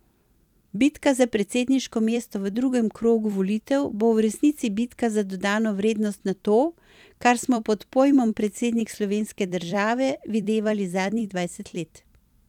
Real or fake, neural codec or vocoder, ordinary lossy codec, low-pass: fake; vocoder, 44.1 kHz, 128 mel bands every 256 samples, BigVGAN v2; none; 19.8 kHz